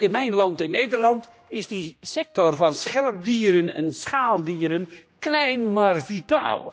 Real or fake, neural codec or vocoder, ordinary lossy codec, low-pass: fake; codec, 16 kHz, 1 kbps, X-Codec, HuBERT features, trained on general audio; none; none